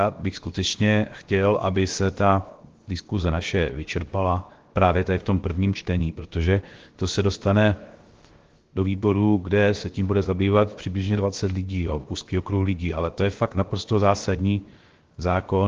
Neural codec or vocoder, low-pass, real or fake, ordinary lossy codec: codec, 16 kHz, 0.7 kbps, FocalCodec; 7.2 kHz; fake; Opus, 16 kbps